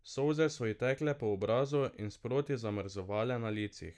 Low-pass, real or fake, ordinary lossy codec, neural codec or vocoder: none; real; none; none